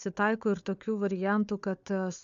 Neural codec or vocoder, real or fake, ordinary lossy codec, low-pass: codec, 16 kHz, 4 kbps, FunCodec, trained on Chinese and English, 50 frames a second; fake; MP3, 64 kbps; 7.2 kHz